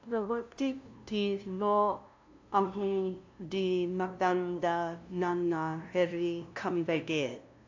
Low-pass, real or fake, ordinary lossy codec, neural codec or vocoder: 7.2 kHz; fake; AAC, 48 kbps; codec, 16 kHz, 0.5 kbps, FunCodec, trained on LibriTTS, 25 frames a second